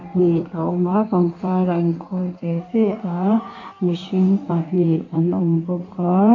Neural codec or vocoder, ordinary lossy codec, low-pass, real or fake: codec, 16 kHz in and 24 kHz out, 1.1 kbps, FireRedTTS-2 codec; MP3, 48 kbps; 7.2 kHz; fake